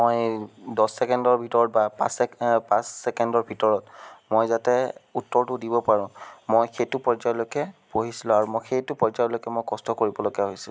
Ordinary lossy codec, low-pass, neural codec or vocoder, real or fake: none; none; none; real